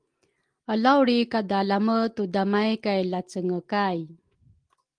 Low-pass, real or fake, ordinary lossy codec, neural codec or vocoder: 9.9 kHz; real; Opus, 24 kbps; none